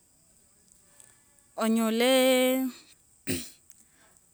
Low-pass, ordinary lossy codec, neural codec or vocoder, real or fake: none; none; none; real